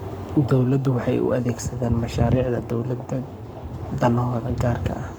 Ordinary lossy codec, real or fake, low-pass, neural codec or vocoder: none; fake; none; codec, 44.1 kHz, 7.8 kbps, Pupu-Codec